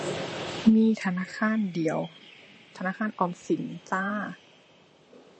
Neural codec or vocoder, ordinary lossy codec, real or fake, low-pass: vocoder, 44.1 kHz, 128 mel bands, Pupu-Vocoder; MP3, 32 kbps; fake; 9.9 kHz